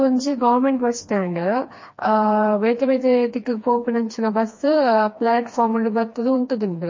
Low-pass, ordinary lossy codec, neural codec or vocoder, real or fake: 7.2 kHz; MP3, 32 kbps; codec, 16 kHz, 2 kbps, FreqCodec, smaller model; fake